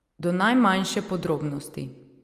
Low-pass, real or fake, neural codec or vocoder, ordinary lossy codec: 14.4 kHz; real; none; Opus, 32 kbps